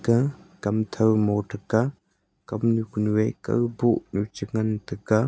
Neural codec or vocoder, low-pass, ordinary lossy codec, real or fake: none; none; none; real